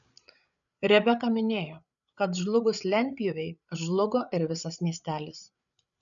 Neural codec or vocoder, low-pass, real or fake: codec, 16 kHz, 8 kbps, FreqCodec, larger model; 7.2 kHz; fake